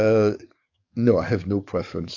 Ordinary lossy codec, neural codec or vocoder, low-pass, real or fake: AAC, 48 kbps; codec, 44.1 kHz, 7.8 kbps, DAC; 7.2 kHz; fake